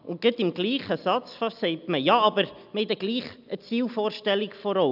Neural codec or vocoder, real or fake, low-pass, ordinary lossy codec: none; real; 5.4 kHz; none